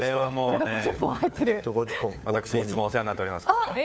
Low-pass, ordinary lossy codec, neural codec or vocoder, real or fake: none; none; codec, 16 kHz, 4 kbps, FunCodec, trained on LibriTTS, 50 frames a second; fake